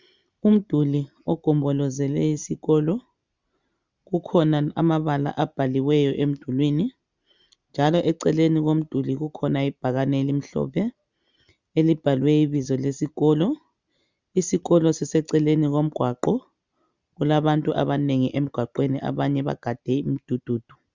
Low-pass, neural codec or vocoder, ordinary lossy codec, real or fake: 7.2 kHz; none; Opus, 64 kbps; real